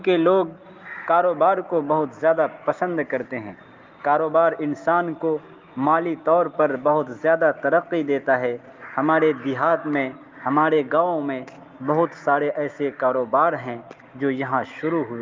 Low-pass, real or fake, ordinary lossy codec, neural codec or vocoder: 7.2 kHz; real; Opus, 32 kbps; none